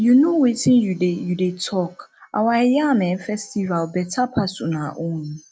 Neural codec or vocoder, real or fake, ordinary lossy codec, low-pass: none; real; none; none